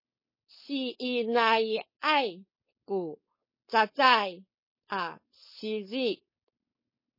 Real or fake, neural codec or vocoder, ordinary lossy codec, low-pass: fake; codec, 16 kHz, 4.8 kbps, FACodec; MP3, 24 kbps; 5.4 kHz